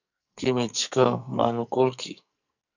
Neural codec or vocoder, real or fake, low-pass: codec, 44.1 kHz, 2.6 kbps, SNAC; fake; 7.2 kHz